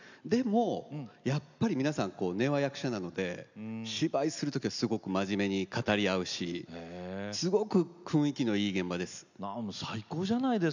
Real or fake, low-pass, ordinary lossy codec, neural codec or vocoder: real; 7.2 kHz; none; none